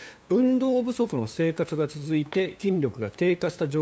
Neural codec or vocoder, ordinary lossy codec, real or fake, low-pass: codec, 16 kHz, 2 kbps, FunCodec, trained on LibriTTS, 25 frames a second; none; fake; none